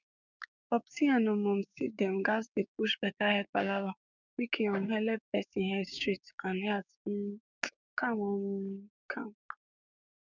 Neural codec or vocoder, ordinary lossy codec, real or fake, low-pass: codec, 16 kHz, 6 kbps, DAC; none; fake; 7.2 kHz